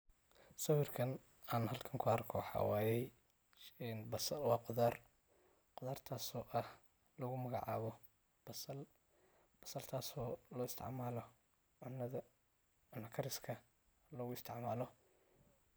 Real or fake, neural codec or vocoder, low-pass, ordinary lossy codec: real; none; none; none